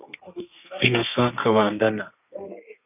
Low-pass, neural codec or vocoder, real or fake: 3.6 kHz; codec, 32 kHz, 1.9 kbps, SNAC; fake